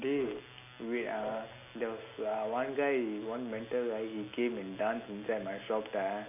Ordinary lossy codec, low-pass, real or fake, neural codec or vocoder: none; 3.6 kHz; real; none